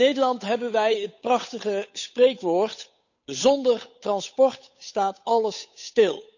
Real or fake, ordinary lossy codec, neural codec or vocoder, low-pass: fake; none; codec, 16 kHz, 8 kbps, FunCodec, trained on Chinese and English, 25 frames a second; 7.2 kHz